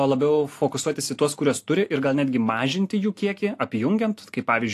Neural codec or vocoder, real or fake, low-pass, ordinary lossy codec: none; real; 14.4 kHz; AAC, 64 kbps